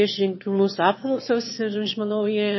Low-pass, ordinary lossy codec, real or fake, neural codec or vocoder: 7.2 kHz; MP3, 24 kbps; fake; autoencoder, 22.05 kHz, a latent of 192 numbers a frame, VITS, trained on one speaker